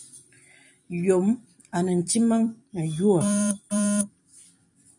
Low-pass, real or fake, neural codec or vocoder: 10.8 kHz; fake; vocoder, 44.1 kHz, 128 mel bands every 256 samples, BigVGAN v2